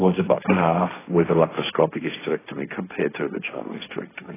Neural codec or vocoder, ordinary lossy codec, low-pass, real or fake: codec, 16 kHz, 1.1 kbps, Voila-Tokenizer; AAC, 16 kbps; 3.6 kHz; fake